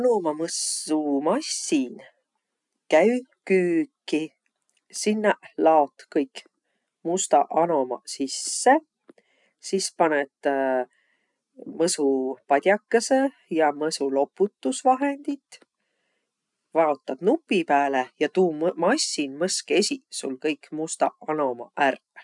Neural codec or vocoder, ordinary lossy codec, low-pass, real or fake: none; none; 10.8 kHz; real